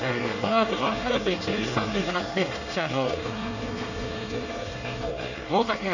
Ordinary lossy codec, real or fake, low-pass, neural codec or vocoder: none; fake; 7.2 kHz; codec, 24 kHz, 1 kbps, SNAC